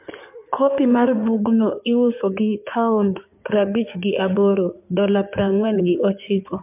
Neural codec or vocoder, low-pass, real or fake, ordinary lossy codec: codec, 16 kHz in and 24 kHz out, 2.2 kbps, FireRedTTS-2 codec; 3.6 kHz; fake; MP3, 32 kbps